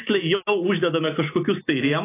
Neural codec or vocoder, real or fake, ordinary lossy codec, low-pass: none; real; AAC, 24 kbps; 3.6 kHz